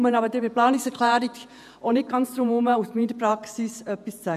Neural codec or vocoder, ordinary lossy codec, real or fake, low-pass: vocoder, 48 kHz, 128 mel bands, Vocos; none; fake; 14.4 kHz